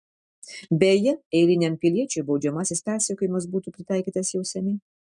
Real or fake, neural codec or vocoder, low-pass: real; none; 10.8 kHz